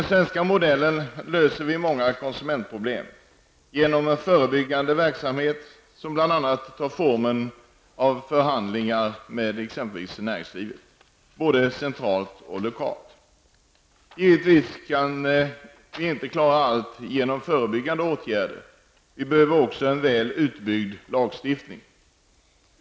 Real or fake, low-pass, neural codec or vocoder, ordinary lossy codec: real; none; none; none